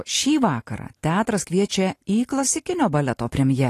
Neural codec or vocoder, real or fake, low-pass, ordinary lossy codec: none; real; 14.4 kHz; AAC, 48 kbps